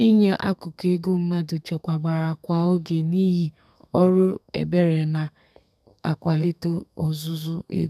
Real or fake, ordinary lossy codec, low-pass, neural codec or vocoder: fake; none; 14.4 kHz; codec, 32 kHz, 1.9 kbps, SNAC